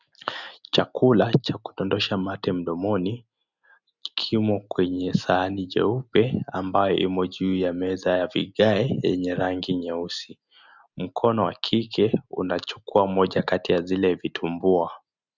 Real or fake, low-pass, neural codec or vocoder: real; 7.2 kHz; none